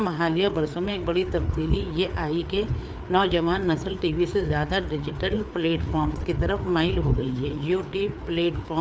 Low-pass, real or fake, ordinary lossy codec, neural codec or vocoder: none; fake; none; codec, 16 kHz, 4 kbps, FreqCodec, larger model